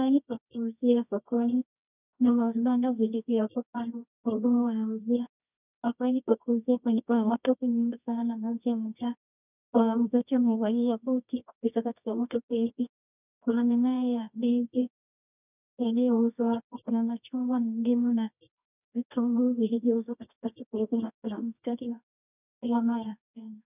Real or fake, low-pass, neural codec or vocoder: fake; 3.6 kHz; codec, 24 kHz, 0.9 kbps, WavTokenizer, medium music audio release